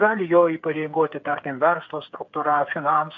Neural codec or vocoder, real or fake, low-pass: codec, 16 kHz, 8 kbps, FreqCodec, smaller model; fake; 7.2 kHz